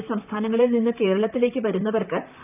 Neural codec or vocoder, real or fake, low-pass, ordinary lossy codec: vocoder, 44.1 kHz, 128 mel bands, Pupu-Vocoder; fake; 3.6 kHz; none